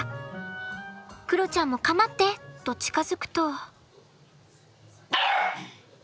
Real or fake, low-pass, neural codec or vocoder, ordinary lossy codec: real; none; none; none